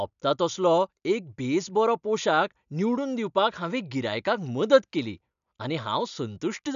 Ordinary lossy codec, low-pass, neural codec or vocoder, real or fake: none; 7.2 kHz; none; real